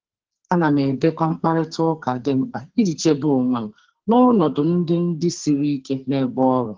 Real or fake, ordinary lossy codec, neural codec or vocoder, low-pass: fake; Opus, 16 kbps; codec, 44.1 kHz, 2.6 kbps, SNAC; 7.2 kHz